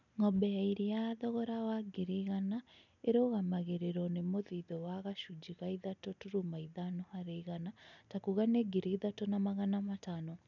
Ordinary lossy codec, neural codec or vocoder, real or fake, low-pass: none; none; real; 7.2 kHz